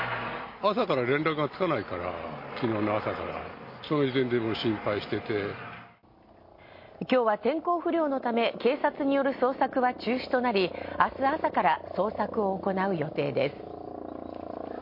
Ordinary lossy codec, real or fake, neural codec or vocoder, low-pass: MP3, 32 kbps; real; none; 5.4 kHz